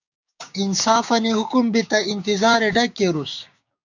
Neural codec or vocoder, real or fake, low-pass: codec, 16 kHz, 6 kbps, DAC; fake; 7.2 kHz